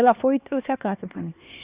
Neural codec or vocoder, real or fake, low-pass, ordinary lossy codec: codec, 16 kHz, 2 kbps, X-Codec, HuBERT features, trained on LibriSpeech; fake; 3.6 kHz; Opus, 64 kbps